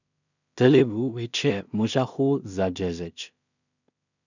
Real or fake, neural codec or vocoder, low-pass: fake; codec, 16 kHz in and 24 kHz out, 0.4 kbps, LongCat-Audio-Codec, two codebook decoder; 7.2 kHz